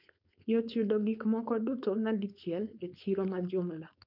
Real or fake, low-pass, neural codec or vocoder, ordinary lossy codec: fake; 5.4 kHz; codec, 16 kHz, 4.8 kbps, FACodec; none